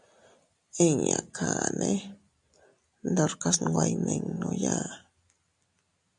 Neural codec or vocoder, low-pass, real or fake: none; 10.8 kHz; real